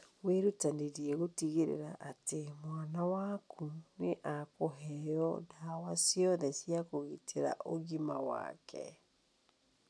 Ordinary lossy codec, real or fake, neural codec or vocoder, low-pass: none; real; none; none